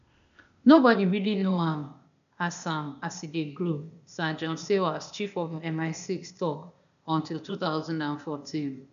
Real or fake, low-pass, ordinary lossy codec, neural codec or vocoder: fake; 7.2 kHz; none; codec, 16 kHz, 0.8 kbps, ZipCodec